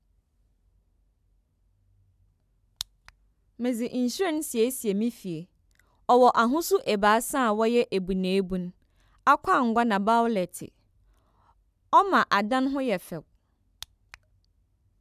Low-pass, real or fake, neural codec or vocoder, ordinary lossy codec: 14.4 kHz; real; none; none